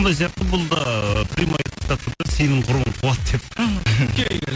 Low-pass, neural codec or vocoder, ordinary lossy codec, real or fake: none; none; none; real